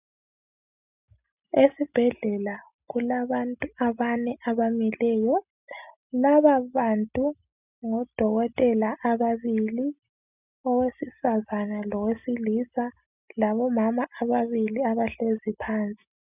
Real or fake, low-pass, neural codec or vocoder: real; 3.6 kHz; none